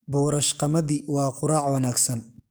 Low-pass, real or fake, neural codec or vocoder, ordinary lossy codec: none; fake; codec, 44.1 kHz, 7.8 kbps, DAC; none